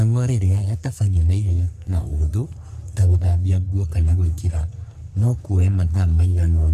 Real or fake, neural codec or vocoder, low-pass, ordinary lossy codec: fake; codec, 44.1 kHz, 3.4 kbps, Pupu-Codec; 14.4 kHz; none